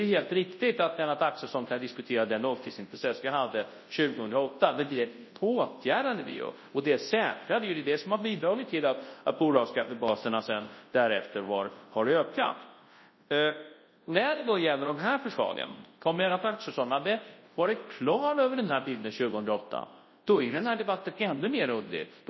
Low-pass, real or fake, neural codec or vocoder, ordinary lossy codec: 7.2 kHz; fake; codec, 24 kHz, 0.9 kbps, WavTokenizer, large speech release; MP3, 24 kbps